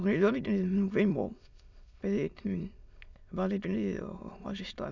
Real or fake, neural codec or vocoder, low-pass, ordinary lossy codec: fake; autoencoder, 22.05 kHz, a latent of 192 numbers a frame, VITS, trained on many speakers; 7.2 kHz; none